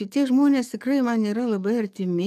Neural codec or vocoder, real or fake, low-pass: codec, 44.1 kHz, 7.8 kbps, DAC; fake; 14.4 kHz